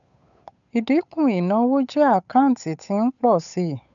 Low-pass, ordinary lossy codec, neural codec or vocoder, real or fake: 7.2 kHz; none; codec, 16 kHz, 8 kbps, FunCodec, trained on Chinese and English, 25 frames a second; fake